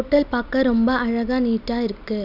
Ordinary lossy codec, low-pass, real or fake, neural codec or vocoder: none; 5.4 kHz; real; none